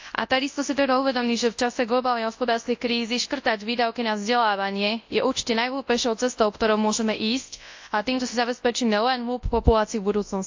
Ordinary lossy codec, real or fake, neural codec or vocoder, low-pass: AAC, 48 kbps; fake; codec, 24 kHz, 0.9 kbps, WavTokenizer, large speech release; 7.2 kHz